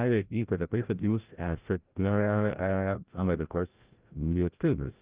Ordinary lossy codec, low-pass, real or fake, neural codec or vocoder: Opus, 24 kbps; 3.6 kHz; fake; codec, 16 kHz, 0.5 kbps, FreqCodec, larger model